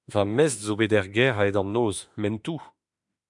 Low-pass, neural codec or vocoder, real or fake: 10.8 kHz; autoencoder, 48 kHz, 32 numbers a frame, DAC-VAE, trained on Japanese speech; fake